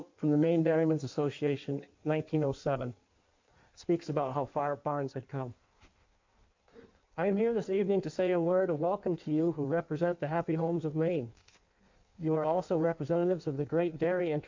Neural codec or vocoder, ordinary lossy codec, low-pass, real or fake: codec, 16 kHz in and 24 kHz out, 1.1 kbps, FireRedTTS-2 codec; MP3, 48 kbps; 7.2 kHz; fake